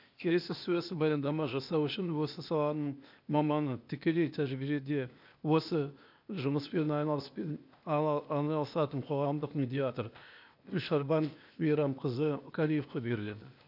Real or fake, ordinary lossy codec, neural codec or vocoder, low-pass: fake; none; codec, 16 kHz, 0.8 kbps, ZipCodec; 5.4 kHz